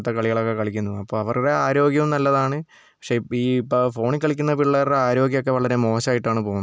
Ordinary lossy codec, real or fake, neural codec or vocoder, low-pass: none; real; none; none